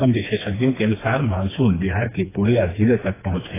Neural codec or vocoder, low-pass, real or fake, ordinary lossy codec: codec, 16 kHz, 2 kbps, FreqCodec, smaller model; 3.6 kHz; fake; AAC, 16 kbps